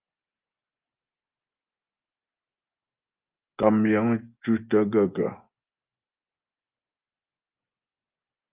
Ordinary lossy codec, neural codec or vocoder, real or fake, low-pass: Opus, 24 kbps; vocoder, 24 kHz, 100 mel bands, Vocos; fake; 3.6 kHz